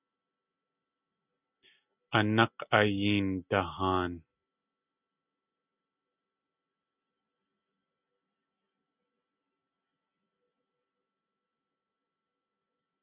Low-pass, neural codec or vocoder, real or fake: 3.6 kHz; none; real